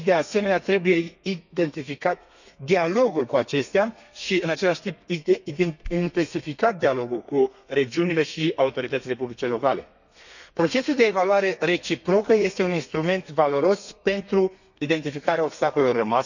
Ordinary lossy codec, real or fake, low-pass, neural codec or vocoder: none; fake; 7.2 kHz; codec, 32 kHz, 1.9 kbps, SNAC